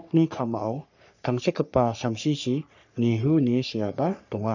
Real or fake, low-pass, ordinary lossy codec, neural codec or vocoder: fake; 7.2 kHz; none; codec, 44.1 kHz, 3.4 kbps, Pupu-Codec